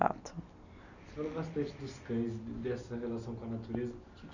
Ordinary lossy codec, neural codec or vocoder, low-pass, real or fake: none; none; 7.2 kHz; real